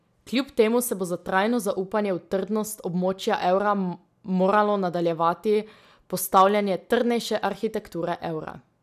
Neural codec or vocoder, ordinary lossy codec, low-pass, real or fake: none; none; 14.4 kHz; real